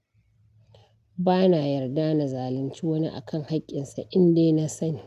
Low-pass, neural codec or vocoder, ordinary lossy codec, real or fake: 14.4 kHz; none; none; real